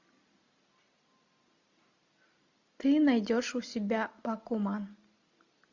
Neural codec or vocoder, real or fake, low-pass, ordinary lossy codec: none; real; 7.2 kHz; AAC, 48 kbps